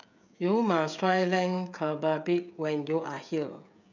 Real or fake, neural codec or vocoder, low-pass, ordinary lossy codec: fake; codec, 16 kHz, 16 kbps, FreqCodec, smaller model; 7.2 kHz; none